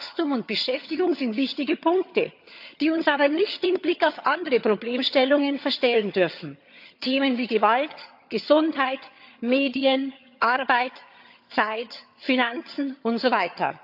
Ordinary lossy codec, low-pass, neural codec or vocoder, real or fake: AAC, 48 kbps; 5.4 kHz; vocoder, 22.05 kHz, 80 mel bands, HiFi-GAN; fake